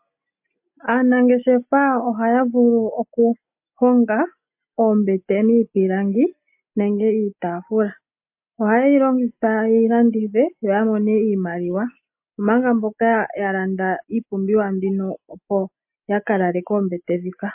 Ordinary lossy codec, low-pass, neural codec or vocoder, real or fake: MP3, 32 kbps; 3.6 kHz; none; real